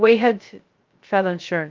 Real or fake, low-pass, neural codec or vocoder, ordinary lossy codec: fake; 7.2 kHz; codec, 16 kHz, 0.2 kbps, FocalCodec; Opus, 32 kbps